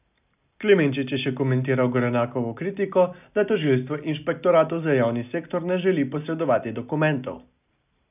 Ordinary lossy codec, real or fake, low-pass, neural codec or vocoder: none; real; 3.6 kHz; none